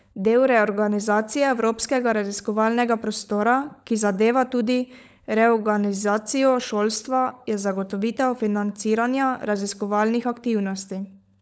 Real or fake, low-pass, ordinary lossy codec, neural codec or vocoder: fake; none; none; codec, 16 kHz, 16 kbps, FunCodec, trained on LibriTTS, 50 frames a second